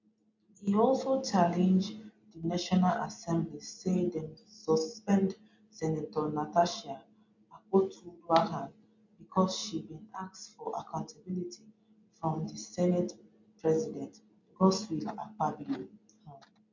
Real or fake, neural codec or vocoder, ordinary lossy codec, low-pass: real; none; MP3, 48 kbps; 7.2 kHz